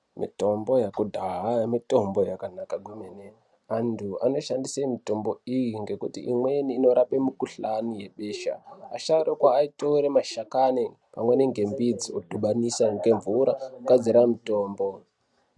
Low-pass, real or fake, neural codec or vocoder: 10.8 kHz; real; none